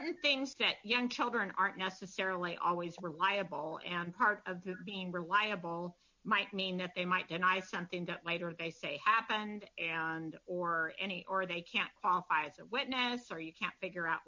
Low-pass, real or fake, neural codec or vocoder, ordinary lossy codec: 7.2 kHz; real; none; MP3, 48 kbps